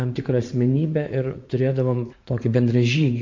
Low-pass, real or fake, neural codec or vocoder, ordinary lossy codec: 7.2 kHz; fake; vocoder, 44.1 kHz, 80 mel bands, Vocos; MP3, 48 kbps